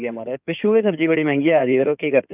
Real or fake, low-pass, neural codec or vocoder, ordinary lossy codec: fake; 3.6 kHz; codec, 16 kHz in and 24 kHz out, 2.2 kbps, FireRedTTS-2 codec; none